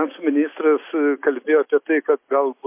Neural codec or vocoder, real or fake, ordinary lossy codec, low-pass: none; real; MP3, 24 kbps; 3.6 kHz